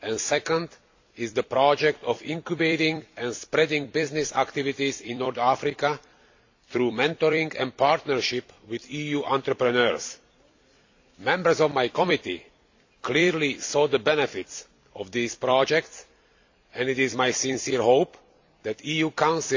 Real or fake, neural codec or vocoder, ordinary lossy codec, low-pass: fake; vocoder, 44.1 kHz, 128 mel bands every 256 samples, BigVGAN v2; AAC, 48 kbps; 7.2 kHz